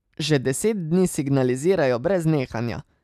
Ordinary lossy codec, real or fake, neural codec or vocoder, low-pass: none; real; none; 14.4 kHz